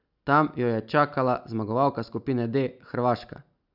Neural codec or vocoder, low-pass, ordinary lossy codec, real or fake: none; 5.4 kHz; none; real